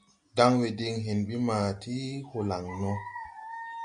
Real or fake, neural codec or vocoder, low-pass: real; none; 9.9 kHz